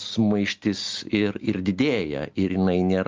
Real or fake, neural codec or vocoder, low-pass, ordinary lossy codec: real; none; 7.2 kHz; Opus, 24 kbps